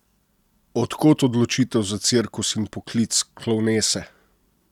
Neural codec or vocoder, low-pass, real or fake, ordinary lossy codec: none; 19.8 kHz; real; none